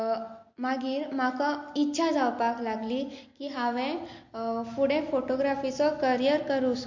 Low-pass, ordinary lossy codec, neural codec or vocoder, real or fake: 7.2 kHz; MP3, 48 kbps; none; real